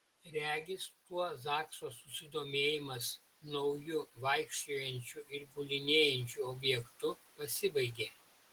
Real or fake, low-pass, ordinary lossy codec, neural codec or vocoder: real; 19.8 kHz; Opus, 16 kbps; none